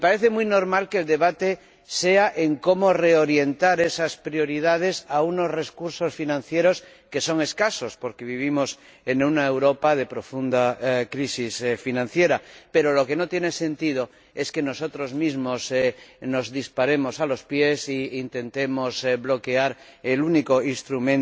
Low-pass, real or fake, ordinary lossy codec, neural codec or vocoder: none; real; none; none